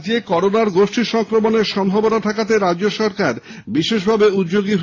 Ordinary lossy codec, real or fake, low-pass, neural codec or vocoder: AAC, 32 kbps; real; 7.2 kHz; none